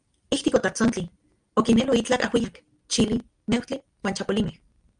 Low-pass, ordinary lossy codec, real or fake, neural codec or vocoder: 9.9 kHz; Opus, 32 kbps; real; none